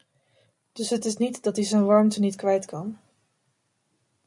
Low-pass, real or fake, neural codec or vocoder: 10.8 kHz; real; none